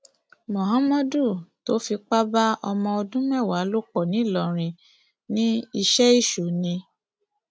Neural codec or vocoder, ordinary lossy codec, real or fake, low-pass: none; none; real; none